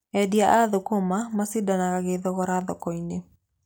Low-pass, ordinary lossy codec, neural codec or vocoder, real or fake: none; none; none; real